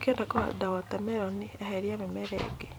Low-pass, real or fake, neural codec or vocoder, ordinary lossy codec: none; fake; vocoder, 44.1 kHz, 128 mel bands every 256 samples, BigVGAN v2; none